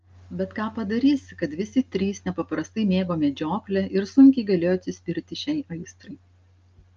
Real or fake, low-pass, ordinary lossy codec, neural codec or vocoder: real; 7.2 kHz; Opus, 24 kbps; none